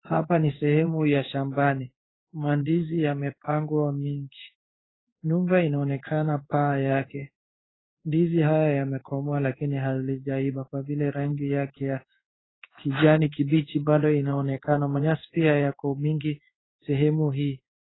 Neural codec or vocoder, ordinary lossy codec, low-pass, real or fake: codec, 16 kHz in and 24 kHz out, 1 kbps, XY-Tokenizer; AAC, 16 kbps; 7.2 kHz; fake